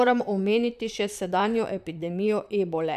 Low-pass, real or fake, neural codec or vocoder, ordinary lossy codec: 14.4 kHz; fake; vocoder, 44.1 kHz, 128 mel bands, Pupu-Vocoder; none